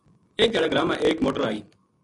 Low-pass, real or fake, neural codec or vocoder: 10.8 kHz; real; none